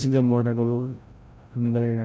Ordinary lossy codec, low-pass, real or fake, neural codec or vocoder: none; none; fake; codec, 16 kHz, 0.5 kbps, FreqCodec, larger model